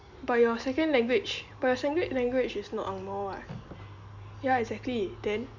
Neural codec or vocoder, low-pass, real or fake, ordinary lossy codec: none; 7.2 kHz; real; none